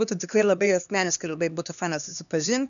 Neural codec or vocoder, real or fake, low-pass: codec, 16 kHz, 2 kbps, X-Codec, HuBERT features, trained on LibriSpeech; fake; 7.2 kHz